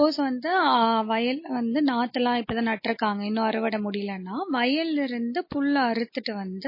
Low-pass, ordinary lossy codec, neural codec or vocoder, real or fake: 5.4 kHz; MP3, 24 kbps; none; real